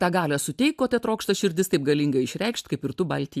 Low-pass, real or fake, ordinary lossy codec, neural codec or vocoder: 14.4 kHz; real; AAC, 96 kbps; none